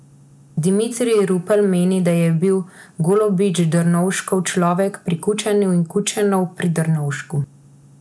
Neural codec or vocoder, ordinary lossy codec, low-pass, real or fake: none; none; none; real